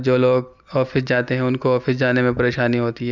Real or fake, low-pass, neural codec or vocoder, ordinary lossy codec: real; 7.2 kHz; none; none